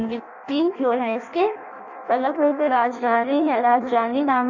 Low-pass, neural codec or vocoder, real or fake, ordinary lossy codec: 7.2 kHz; codec, 16 kHz in and 24 kHz out, 0.6 kbps, FireRedTTS-2 codec; fake; none